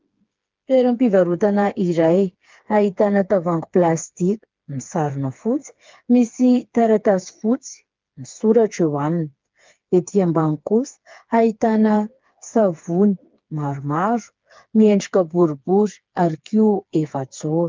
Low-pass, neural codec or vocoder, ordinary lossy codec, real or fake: 7.2 kHz; codec, 16 kHz, 4 kbps, FreqCodec, smaller model; Opus, 24 kbps; fake